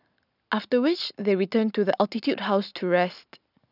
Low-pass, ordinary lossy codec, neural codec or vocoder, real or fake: 5.4 kHz; none; none; real